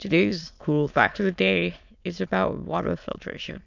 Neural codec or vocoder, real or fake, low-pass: autoencoder, 22.05 kHz, a latent of 192 numbers a frame, VITS, trained on many speakers; fake; 7.2 kHz